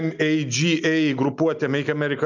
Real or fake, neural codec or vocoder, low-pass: real; none; 7.2 kHz